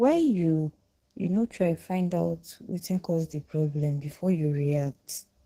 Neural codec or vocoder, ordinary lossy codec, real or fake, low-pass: codec, 32 kHz, 1.9 kbps, SNAC; Opus, 16 kbps; fake; 14.4 kHz